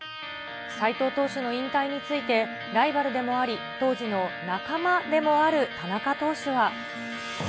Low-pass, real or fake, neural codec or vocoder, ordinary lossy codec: none; real; none; none